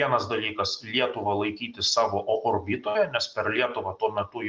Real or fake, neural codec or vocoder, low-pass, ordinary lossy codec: real; none; 7.2 kHz; Opus, 24 kbps